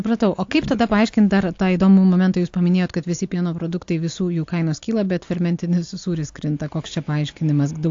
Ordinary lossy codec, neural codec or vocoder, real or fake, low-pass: AAC, 48 kbps; none; real; 7.2 kHz